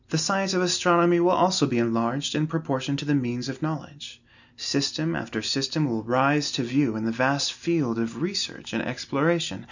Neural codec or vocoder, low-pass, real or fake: none; 7.2 kHz; real